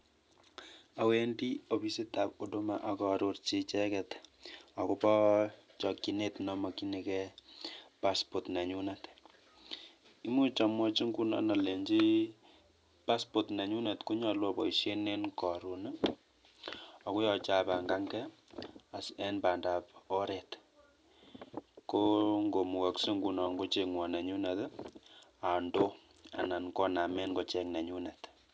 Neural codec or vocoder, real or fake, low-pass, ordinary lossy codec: none; real; none; none